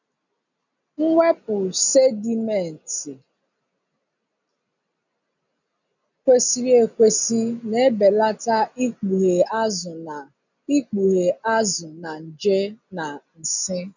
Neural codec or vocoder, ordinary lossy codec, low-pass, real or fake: none; none; 7.2 kHz; real